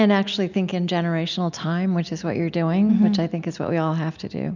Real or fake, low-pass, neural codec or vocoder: real; 7.2 kHz; none